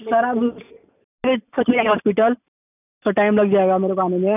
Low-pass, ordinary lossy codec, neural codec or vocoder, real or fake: 3.6 kHz; none; none; real